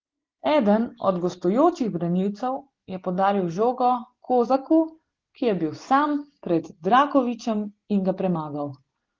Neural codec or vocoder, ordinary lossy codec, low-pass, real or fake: none; Opus, 16 kbps; 7.2 kHz; real